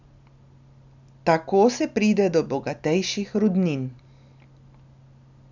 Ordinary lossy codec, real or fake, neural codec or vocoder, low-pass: none; real; none; 7.2 kHz